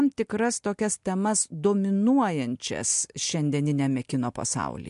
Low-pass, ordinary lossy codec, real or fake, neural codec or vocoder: 10.8 kHz; MP3, 64 kbps; real; none